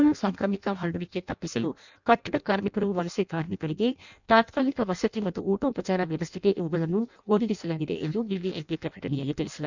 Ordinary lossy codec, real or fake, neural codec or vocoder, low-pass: none; fake; codec, 16 kHz in and 24 kHz out, 0.6 kbps, FireRedTTS-2 codec; 7.2 kHz